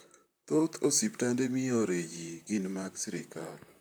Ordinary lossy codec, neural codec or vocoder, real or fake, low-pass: none; vocoder, 44.1 kHz, 128 mel bands, Pupu-Vocoder; fake; none